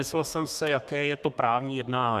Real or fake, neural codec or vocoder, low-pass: fake; codec, 32 kHz, 1.9 kbps, SNAC; 14.4 kHz